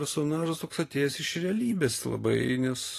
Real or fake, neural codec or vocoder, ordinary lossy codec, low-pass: fake; vocoder, 44.1 kHz, 128 mel bands every 512 samples, BigVGAN v2; AAC, 48 kbps; 14.4 kHz